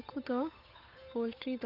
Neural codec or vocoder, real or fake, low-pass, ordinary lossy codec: codec, 16 kHz, 8 kbps, FunCodec, trained on Chinese and English, 25 frames a second; fake; 5.4 kHz; none